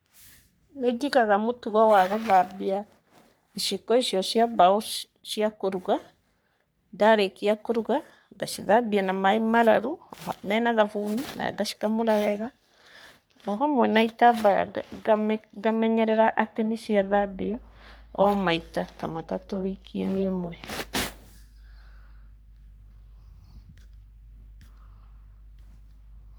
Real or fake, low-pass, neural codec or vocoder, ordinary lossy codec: fake; none; codec, 44.1 kHz, 3.4 kbps, Pupu-Codec; none